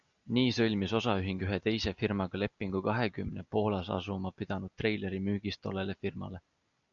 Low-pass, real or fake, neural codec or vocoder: 7.2 kHz; real; none